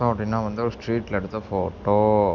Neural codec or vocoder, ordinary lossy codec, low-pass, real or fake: none; none; 7.2 kHz; real